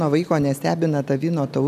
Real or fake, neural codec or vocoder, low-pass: real; none; 14.4 kHz